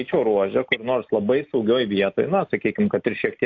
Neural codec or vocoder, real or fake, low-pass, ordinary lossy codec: none; real; 7.2 kHz; AAC, 48 kbps